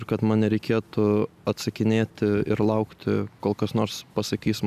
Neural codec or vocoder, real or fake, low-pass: none; real; 14.4 kHz